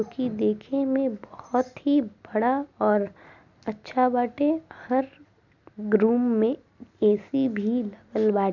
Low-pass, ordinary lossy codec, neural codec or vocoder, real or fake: 7.2 kHz; none; none; real